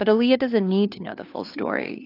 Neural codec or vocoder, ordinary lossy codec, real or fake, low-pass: codec, 16 kHz, 2 kbps, FunCodec, trained on LibriTTS, 25 frames a second; AAC, 32 kbps; fake; 5.4 kHz